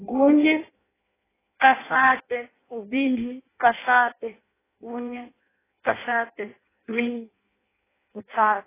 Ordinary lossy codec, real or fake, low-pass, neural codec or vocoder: AAC, 16 kbps; fake; 3.6 kHz; codec, 16 kHz in and 24 kHz out, 0.6 kbps, FireRedTTS-2 codec